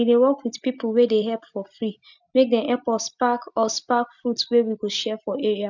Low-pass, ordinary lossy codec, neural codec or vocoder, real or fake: 7.2 kHz; none; none; real